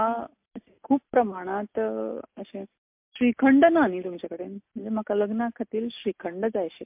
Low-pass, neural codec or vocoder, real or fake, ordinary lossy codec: 3.6 kHz; none; real; MP3, 32 kbps